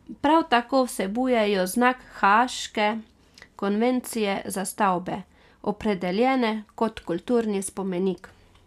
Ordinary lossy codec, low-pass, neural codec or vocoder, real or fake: none; 14.4 kHz; none; real